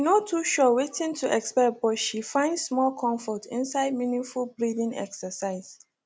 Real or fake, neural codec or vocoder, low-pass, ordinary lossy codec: real; none; none; none